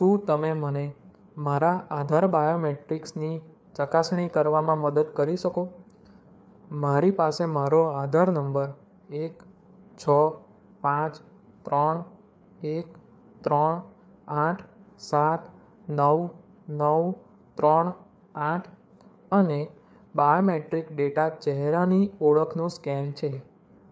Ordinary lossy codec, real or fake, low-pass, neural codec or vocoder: none; fake; none; codec, 16 kHz, 4 kbps, FreqCodec, larger model